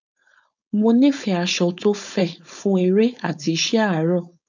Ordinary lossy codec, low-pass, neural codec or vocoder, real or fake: none; 7.2 kHz; codec, 16 kHz, 4.8 kbps, FACodec; fake